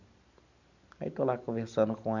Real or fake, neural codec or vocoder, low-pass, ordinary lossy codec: real; none; 7.2 kHz; none